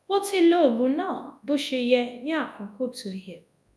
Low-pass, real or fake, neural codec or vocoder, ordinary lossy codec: none; fake; codec, 24 kHz, 0.9 kbps, WavTokenizer, large speech release; none